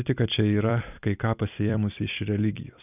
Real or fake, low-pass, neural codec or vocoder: fake; 3.6 kHz; vocoder, 44.1 kHz, 128 mel bands every 256 samples, BigVGAN v2